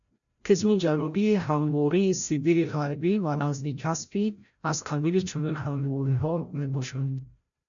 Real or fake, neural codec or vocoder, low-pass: fake; codec, 16 kHz, 0.5 kbps, FreqCodec, larger model; 7.2 kHz